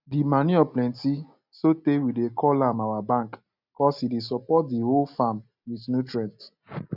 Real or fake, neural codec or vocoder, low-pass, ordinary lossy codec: real; none; 5.4 kHz; none